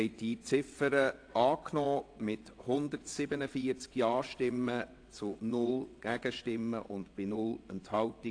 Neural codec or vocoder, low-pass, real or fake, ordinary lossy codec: vocoder, 22.05 kHz, 80 mel bands, WaveNeXt; 9.9 kHz; fake; AAC, 48 kbps